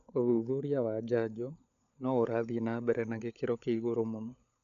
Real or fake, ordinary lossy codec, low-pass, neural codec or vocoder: fake; MP3, 96 kbps; 7.2 kHz; codec, 16 kHz, 16 kbps, FunCodec, trained on LibriTTS, 50 frames a second